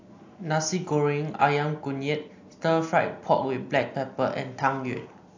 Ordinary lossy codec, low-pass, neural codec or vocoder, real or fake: AAC, 48 kbps; 7.2 kHz; none; real